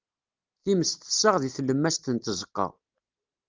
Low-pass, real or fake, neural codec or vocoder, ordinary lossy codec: 7.2 kHz; real; none; Opus, 24 kbps